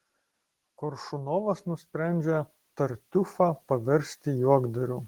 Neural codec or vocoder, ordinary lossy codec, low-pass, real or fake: none; Opus, 16 kbps; 19.8 kHz; real